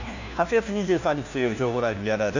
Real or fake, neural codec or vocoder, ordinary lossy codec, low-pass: fake; codec, 16 kHz, 1 kbps, FunCodec, trained on LibriTTS, 50 frames a second; none; 7.2 kHz